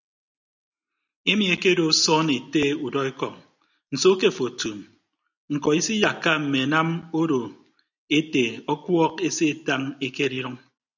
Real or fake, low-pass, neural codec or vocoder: real; 7.2 kHz; none